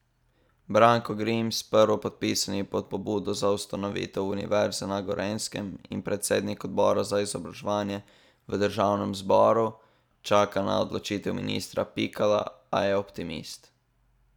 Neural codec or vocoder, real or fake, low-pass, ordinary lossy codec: none; real; 19.8 kHz; none